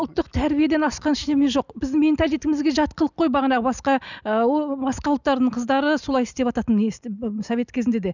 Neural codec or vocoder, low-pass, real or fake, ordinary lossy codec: none; 7.2 kHz; real; none